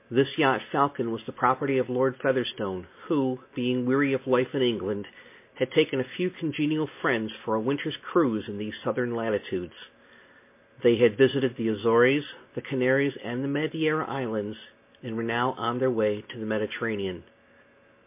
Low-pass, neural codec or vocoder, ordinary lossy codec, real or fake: 3.6 kHz; none; MP3, 24 kbps; real